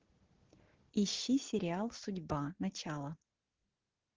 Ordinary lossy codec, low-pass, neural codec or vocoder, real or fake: Opus, 16 kbps; 7.2 kHz; none; real